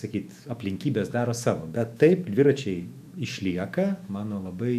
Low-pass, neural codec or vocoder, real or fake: 14.4 kHz; autoencoder, 48 kHz, 128 numbers a frame, DAC-VAE, trained on Japanese speech; fake